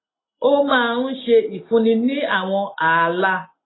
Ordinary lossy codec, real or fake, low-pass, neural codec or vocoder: AAC, 16 kbps; real; 7.2 kHz; none